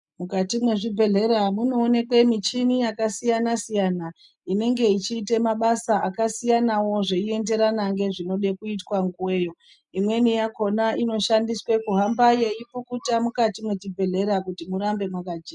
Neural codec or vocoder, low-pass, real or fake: none; 10.8 kHz; real